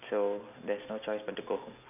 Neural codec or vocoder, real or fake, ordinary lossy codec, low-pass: none; real; none; 3.6 kHz